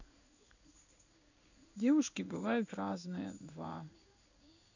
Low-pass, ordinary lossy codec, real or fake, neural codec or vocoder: 7.2 kHz; none; fake; codec, 16 kHz in and 24 kHz out, 1 kbps, XY-Tokenizer